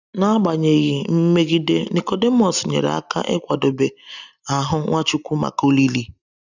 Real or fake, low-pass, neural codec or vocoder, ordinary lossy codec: real; 7.2 kHz; none; none